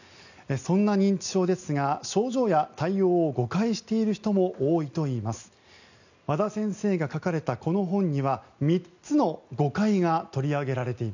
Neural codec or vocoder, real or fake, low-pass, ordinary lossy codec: none; real; 7.2 kHz; none